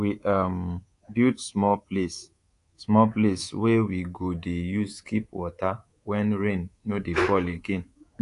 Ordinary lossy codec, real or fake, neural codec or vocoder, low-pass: AAC, 48 kbps; fake; codec, 24 kHz, 3.1 kbps, DualCodec; 10.8 kHz